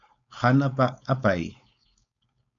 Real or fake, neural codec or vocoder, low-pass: fake; codec, 16 kHz, 4.8 kbps, FACodec; 7.2 kHz